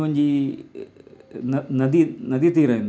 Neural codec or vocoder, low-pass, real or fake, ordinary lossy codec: none; none; real; none